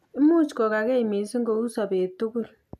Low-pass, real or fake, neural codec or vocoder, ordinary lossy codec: 14.4 kHz; real; none; AAC, 96 kbps